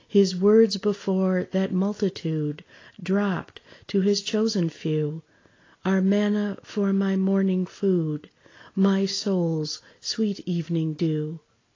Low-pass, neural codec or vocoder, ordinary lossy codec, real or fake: 7.2 kHz; none; AAC, 32 kbps; real